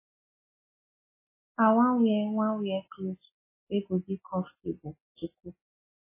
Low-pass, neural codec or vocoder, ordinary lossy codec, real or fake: 3.6 kHz; none; MP3, 16 kbps; real